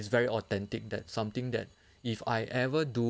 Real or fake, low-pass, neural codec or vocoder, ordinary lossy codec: real; none; none; none